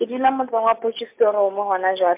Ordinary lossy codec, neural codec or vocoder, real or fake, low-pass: MP3, 32 kbps; none; real; 3.6 kHz